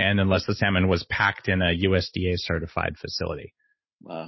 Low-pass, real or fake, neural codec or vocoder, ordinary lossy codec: 7.2 kHz; real; none; MP3, 24 kbps